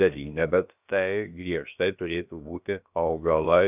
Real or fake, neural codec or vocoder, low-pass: fake; codec, 16 kHz, about 1 kbps, DyCAST, with the encoder's durations; 3.6 kHz